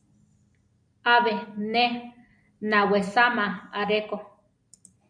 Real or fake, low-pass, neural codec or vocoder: real; 9.9 kHz; none